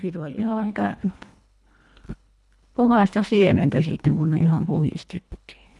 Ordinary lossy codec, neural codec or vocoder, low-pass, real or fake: none; codec, 24 kHz, 1.5 kbps, HILCodec; none; fake